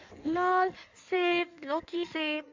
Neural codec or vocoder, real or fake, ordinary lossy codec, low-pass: codec, 16 kHz in and 24 kHz out, 1.1 kbps, FireRedTTS-2 codec; fake; MP3, 64 kbps; 7.2 kHz